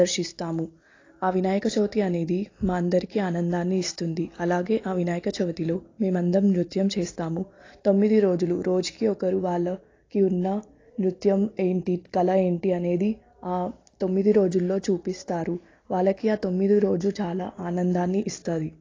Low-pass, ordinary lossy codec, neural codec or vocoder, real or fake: 7.2 kHz; AAC, 32 kbps; none; real